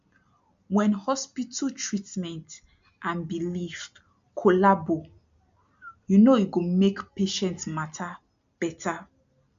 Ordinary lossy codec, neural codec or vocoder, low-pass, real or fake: MP3, 64 kbps; none; 7.2 kHz; real